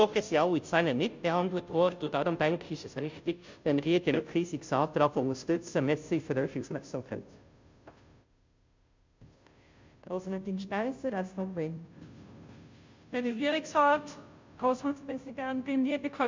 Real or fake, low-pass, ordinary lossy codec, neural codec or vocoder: fake; 7.2 kHz; MP3, 64 kbps; codec, 16 kHz, 0.5 kbps, FunCodec, trained on Chinese and English, 25 frames a second